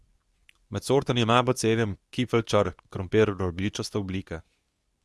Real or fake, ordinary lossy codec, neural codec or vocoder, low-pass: fake; none; codec, 24 kHz, 0.9 kbps, WavTokenizer, medium speech release version 2; none